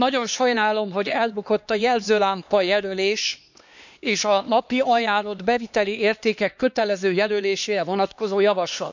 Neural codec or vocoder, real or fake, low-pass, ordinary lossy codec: codec, 16 kHz, 2 kbps, X-Codec, HuBERT features, trained on LibriSpeech; fake; 7.2 kHz; none